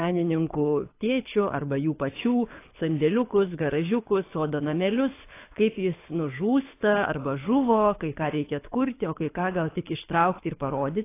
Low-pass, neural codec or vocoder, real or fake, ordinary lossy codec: 3.6 kHz; codec, 24 kHz, 6 kbps, HILCodec; fake; AAC, 24 kbps